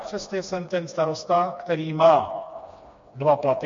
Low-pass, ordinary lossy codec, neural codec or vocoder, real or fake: 7.2 kHz; MP3, 48 kbps; codec, 16 kHz, 2 kbps, FreqCodec, smaller model; fake